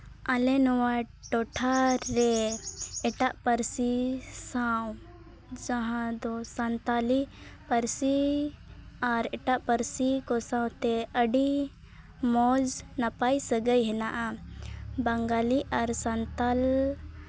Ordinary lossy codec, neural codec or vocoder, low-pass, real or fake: none; none; none; real